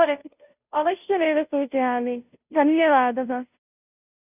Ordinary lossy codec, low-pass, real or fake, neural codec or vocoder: none; 3.6 kHz; fake; codec, 16 kHz, 0.5 kbps, FunCodec, trained on Chinese and English, 25 frames a second